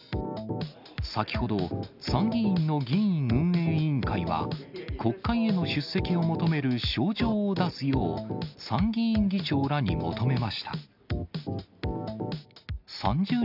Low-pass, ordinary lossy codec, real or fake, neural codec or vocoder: 5.4 kHz; none; real; none